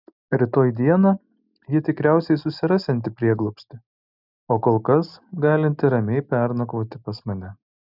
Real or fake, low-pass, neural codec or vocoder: real; 5.4 kHz; none